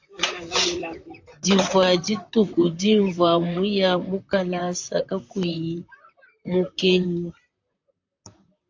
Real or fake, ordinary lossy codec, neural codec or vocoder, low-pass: fake; AAC, 48 kbps; vocoder, 44.1 kHz, 128 mel bands, Pupu-Vocoder; 7.2 kHz